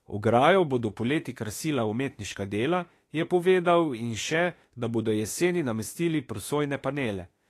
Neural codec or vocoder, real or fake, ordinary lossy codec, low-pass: autoencoder, 48 kHz, 32 numbers a frame, DAC-VAE, trained on Japanese speech; fake; AAC, 48 kbps; 14.4 kHz